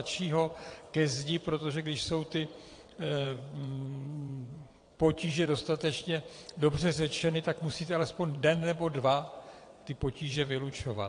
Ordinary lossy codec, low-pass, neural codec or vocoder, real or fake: AAC, 48 kbps; 9.9 kHz; vocoder, 22.05 kHz, 80 mel bands, WaveNeXt; fake